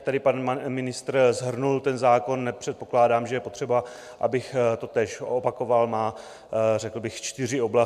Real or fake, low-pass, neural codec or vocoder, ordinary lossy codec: real; 14.4 kHz; none; MP3, 96 kbps